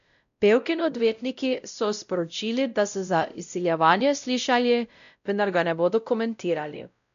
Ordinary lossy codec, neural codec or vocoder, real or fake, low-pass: none; codec, 16 kHz, 0.5 kbps, X-Codec, WavLM features, trained on Multilingual LibriSpeech; fake; 7.2 kHz